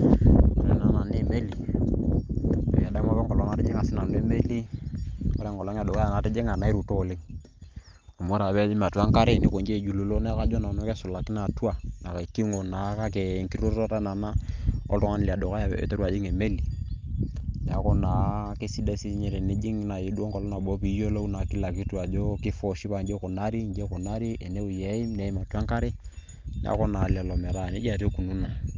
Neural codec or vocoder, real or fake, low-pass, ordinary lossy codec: none; real; 7.2 kHz; Opus, 24 kbps